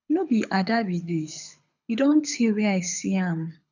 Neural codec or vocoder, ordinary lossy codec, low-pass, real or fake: codec, 24 kHz, 6 kbps, HILCodec; none; 7.2 kHz; fake